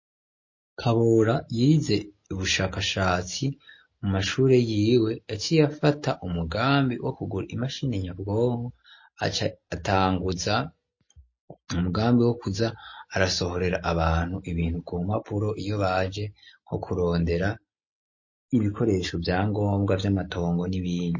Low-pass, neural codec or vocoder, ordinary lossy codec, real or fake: 7.2 kHz; none; MP3, 32 kbps; real